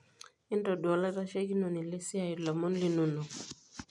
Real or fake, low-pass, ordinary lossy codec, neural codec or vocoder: real; 10.8 kHz; none; none